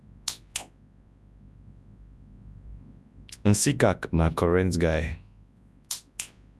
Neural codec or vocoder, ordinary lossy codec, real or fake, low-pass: codec, 24 kHz, 0.9 kbps, WavTokenizer, large speech release; none; fake; none